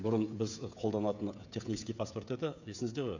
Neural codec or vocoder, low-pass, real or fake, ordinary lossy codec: codec, 16 kHz, 16 kbps, FreqCodec, smaller model; 7.2 kHz; fake; none